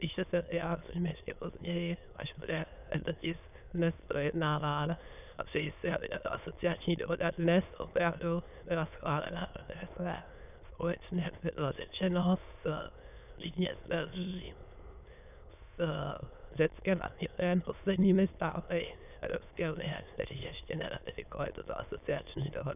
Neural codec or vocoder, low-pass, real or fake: autoencoder, 22.05 kHz, a latent of 192 numbers a frame, VITS, trained on many speakers; 3.6 kHz; fake